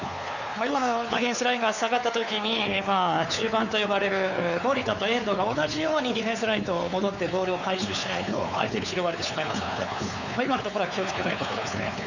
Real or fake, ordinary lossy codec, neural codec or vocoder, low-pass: fake; none; codec, 16 kHz, 4 kbps, X-Codec, WavLM features, trained on Multilingual LibriSpeech; 7.2 kHz